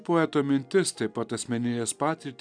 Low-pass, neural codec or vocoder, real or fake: 9.9 kHz; none; real